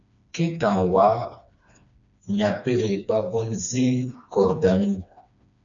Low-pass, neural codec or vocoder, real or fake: 7.2 kHz; codec, 16 kHz, 2 kbps, FreqCodec, smaller model; fake